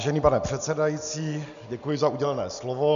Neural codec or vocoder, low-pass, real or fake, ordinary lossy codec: none; 7.2 kHz; real; MP3, 96 kbps